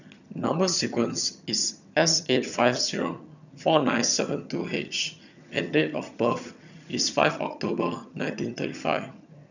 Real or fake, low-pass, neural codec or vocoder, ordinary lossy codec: fake; 7.2 kHz; vocoder, 22.05 kHz, 80 mel bands, HiFi-GAN; none